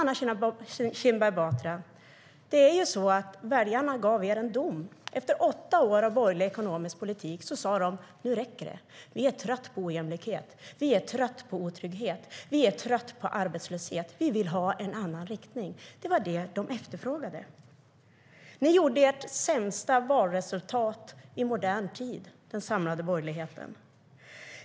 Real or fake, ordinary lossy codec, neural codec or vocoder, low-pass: real; none; none; none